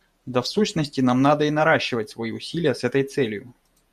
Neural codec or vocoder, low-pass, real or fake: vocoder, 48 kHz, 128 mel bands, Vocos; 14.4 kHz; fake